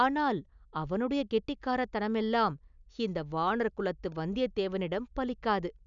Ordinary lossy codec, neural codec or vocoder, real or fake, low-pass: none; none; real; 7.2 kHz